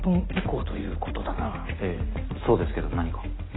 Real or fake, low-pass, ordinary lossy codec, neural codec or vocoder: real; 7.2 kHz; AAC, 16 kbps; none